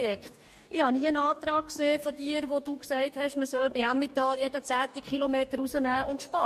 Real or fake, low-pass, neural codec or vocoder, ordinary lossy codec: fake; 14.4 kHz; codec, 44.1 kHz, 2.6 kbps, DAC; MP3, 64 kbps